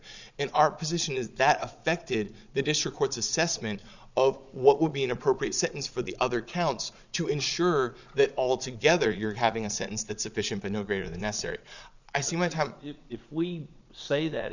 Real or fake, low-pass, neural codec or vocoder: fake; 7.2 kHz; vocoder, 22.05 kHz, 80 mel bands, Vocos